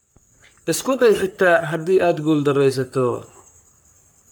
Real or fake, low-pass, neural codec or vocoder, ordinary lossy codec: fake; none; codec, 44.1 kHz, 3.4 kbps, Pupu-Codec; none